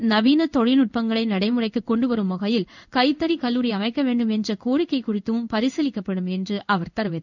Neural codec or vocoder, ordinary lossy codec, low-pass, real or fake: codec, 16 kHz in and 24 kHz out, 1 kbps, XY-Tokenizer; none; 7.2 kHz; fake